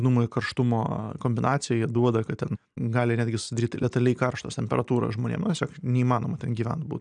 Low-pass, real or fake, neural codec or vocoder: 9.9 kHz; real; none